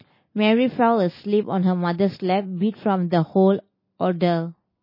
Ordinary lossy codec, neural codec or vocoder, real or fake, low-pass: MP3, 24 kbps; none; real; 5.4 kHz